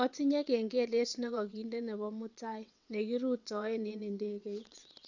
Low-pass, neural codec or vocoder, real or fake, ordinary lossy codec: 7.2 kHz; vocoder, 22.05 kHz, 80 mel bands, WaveNeXt; fake; none